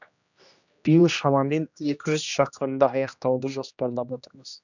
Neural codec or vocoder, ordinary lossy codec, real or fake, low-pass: codec, 16 kHz, 1 kbps, X-Codec, HuBERT features, trained on general audio; none; fake; 7.2 kHz